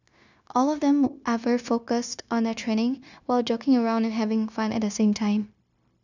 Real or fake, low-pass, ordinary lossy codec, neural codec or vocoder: fake; 7.2 kHz; none; codec, 16 kHz, 0.9 kbps, LongCat-Audio-Codec